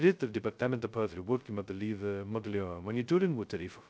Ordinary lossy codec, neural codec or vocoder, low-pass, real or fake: none; codec, 16 kHz, 0.2 kbps, FocalCodec; none; fake